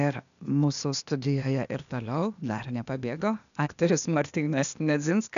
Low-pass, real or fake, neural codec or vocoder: 7.2 kHz; fake; codec, 16 kHz, 0.8 kbps, ZipCodec